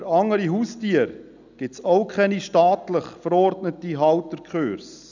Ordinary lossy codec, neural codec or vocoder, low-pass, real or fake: none; none; 7.2 kHz; real